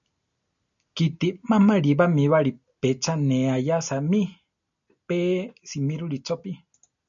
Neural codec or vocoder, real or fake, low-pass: none; real; 7.2 kHz